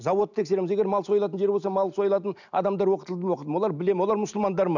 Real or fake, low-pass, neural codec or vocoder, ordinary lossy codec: real; 7.2 kHz; none; none